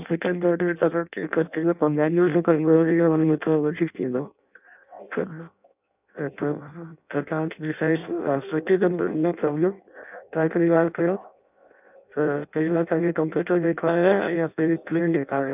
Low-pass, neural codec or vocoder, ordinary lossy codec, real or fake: 3.6 kHz; codec, 16 kHz in and 24 kHz out, 0.6 kbps, FireRedTTS-2 codec; none; fake